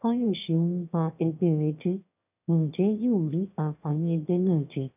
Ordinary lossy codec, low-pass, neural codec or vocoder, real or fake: none; 3.6 kHz; autoencoder, 22.05 kHz, a latent of 192 numbers a frame, VITS, trained on one speaker; fake